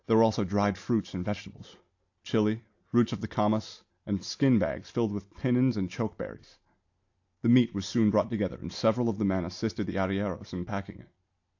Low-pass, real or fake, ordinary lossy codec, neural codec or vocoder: 7.2 kHz; real; AAC, 48 kbps; none